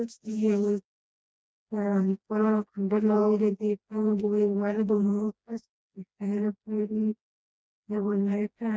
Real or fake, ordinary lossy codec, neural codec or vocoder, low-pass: fake; none; codec, 16 kHz, 1 kbps, FreqCodec, smaller model; none